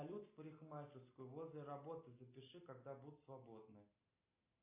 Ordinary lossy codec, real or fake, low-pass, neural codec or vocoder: AAC, 24 kbps; real; 3.6 kHz; none